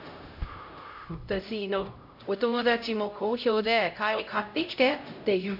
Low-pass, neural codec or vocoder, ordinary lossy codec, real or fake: 5.4 kHz; codec, 16 kHz, 0.5 kbps, X-Codec, HuBERT features, trained on LibriSpeech; AAC, 48 kbps; fake